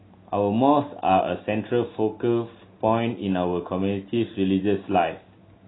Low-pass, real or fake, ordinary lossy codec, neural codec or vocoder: 7.2 kHz; real; AAC, 16 kbps; none